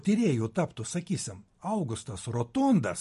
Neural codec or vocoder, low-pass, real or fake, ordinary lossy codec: none; 14.4 kHz; real; MP3, 48 kbps